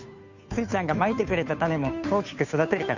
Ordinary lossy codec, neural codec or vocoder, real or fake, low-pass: none; codec, 16 kHz, 2 kbps, FunCodec, trained on Chinese and English, 25 frames a second; fake; 7.2 kHz